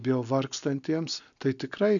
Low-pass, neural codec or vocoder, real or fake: 7.2 kHz; none; real